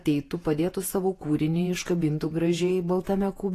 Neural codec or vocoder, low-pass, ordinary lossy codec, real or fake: vocoder, 48 kHz, 128 mel bands, Vocos; 14.4 kHz; AAC, 48 kbps; fake